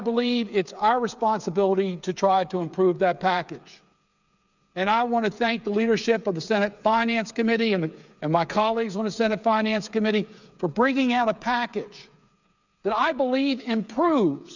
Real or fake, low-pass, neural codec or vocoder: fake; 7.2 kHz; codec, 16 kHz, 8 kbps, FreqCodec, smaller model